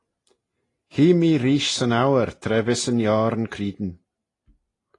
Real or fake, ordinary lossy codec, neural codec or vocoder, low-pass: real; AAC, 32 kbps; none; 10.8 kHz